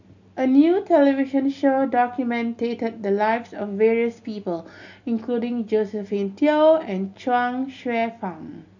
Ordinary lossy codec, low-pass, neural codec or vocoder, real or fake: none; 7.2 kHz; none; real